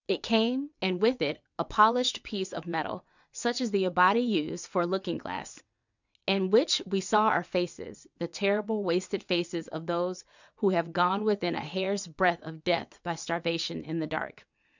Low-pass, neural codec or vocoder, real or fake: 7.2 kHz; vocoder, 22.05 kHz, 80 mel bands, WaveNeXt; fake